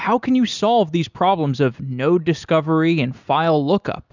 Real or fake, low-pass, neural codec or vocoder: real; 7.2 kHz; none